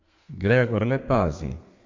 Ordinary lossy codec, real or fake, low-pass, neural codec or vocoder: MP3, 48 kbps; fake; 7.2 kHz; codec, 32 kHz, 1.9 kbps, SNAC